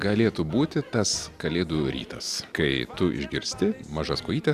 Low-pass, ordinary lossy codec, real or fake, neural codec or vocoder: 14.4 kHz; Opus, 64 kbps; real; none